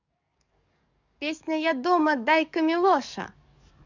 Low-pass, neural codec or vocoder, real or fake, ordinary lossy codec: 7.2 kHz; codec, 44.1 kHz, 7.8 kbps, DAC; fake; none